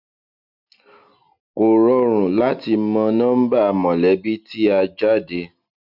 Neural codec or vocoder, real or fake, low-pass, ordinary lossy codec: none; real; 5.4 kHz; none